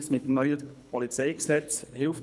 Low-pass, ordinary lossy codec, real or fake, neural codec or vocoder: none; none; fake; codec, 24 kHz, 3 kbps, HILCodec